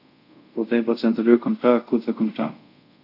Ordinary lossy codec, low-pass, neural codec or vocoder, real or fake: none; 5.4 kHz; codec, 24 kHz, 0.5 kbps, DualCodec; fake